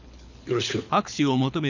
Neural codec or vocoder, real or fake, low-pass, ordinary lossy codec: codec, 24 kHz, 6 kbps, HILCodec; fake; 7.2 kHz; none